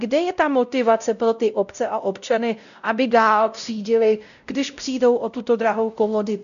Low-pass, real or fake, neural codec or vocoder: 7.2 kHz; fake; codec, 16 kHz, 0.5 kbps, X-Codec, WavLM features, trained on Multilingual LibriSpeech